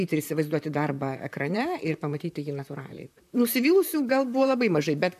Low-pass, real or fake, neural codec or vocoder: 14.4 kHz; fake; vocoder, 44.1 kHz, 128 mel bands, Pupu-Vocoder